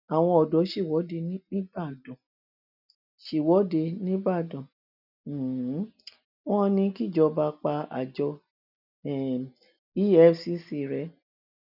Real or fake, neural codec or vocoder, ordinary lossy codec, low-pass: real; none; none; 5.4 kHz